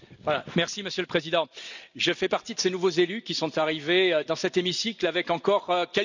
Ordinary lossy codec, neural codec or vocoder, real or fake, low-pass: none; none; real; 7.2 kHz